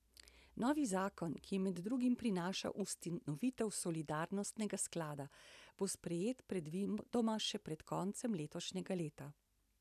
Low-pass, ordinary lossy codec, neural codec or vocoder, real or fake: 14.4 kHz; none; vocoder, 44.1 kHz, 128 mel bands every 256 samples, BigVGAN v2; fake